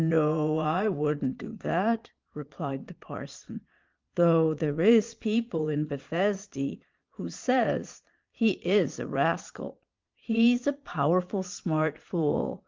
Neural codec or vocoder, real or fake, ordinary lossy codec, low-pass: vocoder, 22.05 kHz, 80 mel bands, Vocos; fake; Opus, 32 kbps; 7.2 kHz